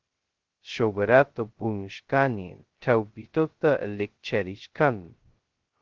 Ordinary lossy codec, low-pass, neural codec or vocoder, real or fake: Opus, 16 kbps; 7.2 kHz; codec, 16 kHz, 0.2 kbps, FocalCodec; fake